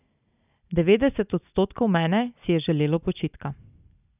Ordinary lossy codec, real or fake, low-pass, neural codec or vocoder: none; real; 3.6 kHz; none